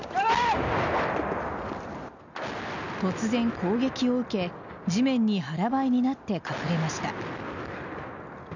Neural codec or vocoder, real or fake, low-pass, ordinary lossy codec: none; real; 7.2 kHz; none